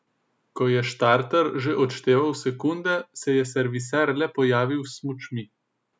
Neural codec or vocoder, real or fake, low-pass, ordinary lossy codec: none; real; none; none